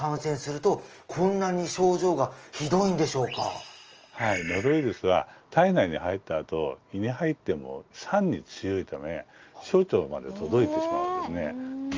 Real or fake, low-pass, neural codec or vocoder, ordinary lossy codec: real; 7.2 kHz; none; Opus, 24 kbps